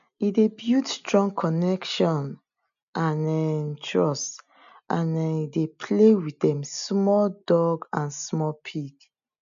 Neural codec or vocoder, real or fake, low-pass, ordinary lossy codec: none; real; 7.2 kHz; none